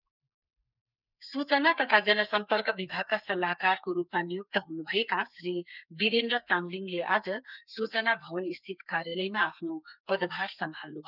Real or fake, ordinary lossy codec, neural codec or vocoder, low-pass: fake; none; codec, 32 kHz, 1.9 kbps, SNAC; 5.4 kHz